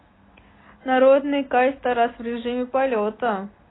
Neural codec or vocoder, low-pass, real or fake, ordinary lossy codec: none; 7.2 kHz; real; AAC, 16 kbps